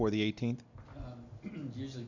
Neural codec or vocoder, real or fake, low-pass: none; real; 7.2 kHz